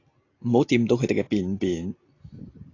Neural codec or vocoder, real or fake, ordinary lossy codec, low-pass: none; real; AAC, 32 kbps; 7.2 kHz